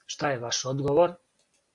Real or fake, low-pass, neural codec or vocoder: real; 10.8 kHz; none